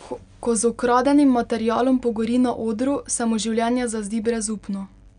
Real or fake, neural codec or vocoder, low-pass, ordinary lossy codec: real; none; 9.9 kHz; none